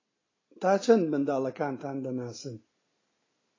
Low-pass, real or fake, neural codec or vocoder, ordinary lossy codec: 7.2 kHz; real; none; AAC, 32 kbps